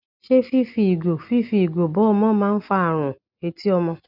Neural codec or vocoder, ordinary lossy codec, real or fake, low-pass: none; none; real; 5.4 kHz